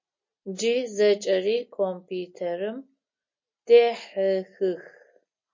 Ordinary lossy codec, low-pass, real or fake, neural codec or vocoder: MP3, 32 kbps; 7.2 kHz; real; none